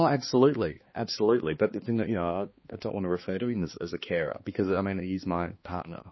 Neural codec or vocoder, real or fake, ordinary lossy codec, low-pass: codec, 16 kHz, 2 kbps, X-Codec, HuBERT features, trained on balanced general audio; fake; MP3, 24 kbps; 7.2 kHz